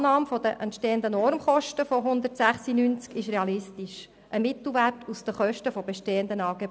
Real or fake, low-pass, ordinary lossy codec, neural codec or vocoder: real; none; none; none